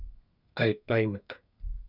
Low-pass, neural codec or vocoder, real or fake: 5.4 kHz; codec, 32 kHz, 1.9 kbps, SNAC; fake